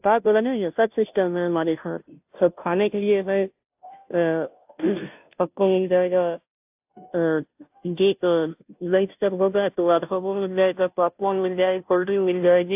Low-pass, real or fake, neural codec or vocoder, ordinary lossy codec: 3.6 kHz; fake; codec, 16 kHz, 0.5 kbps, FunCodec, trained on Chinese and English, 25 frames a second; AAC, 32 kbps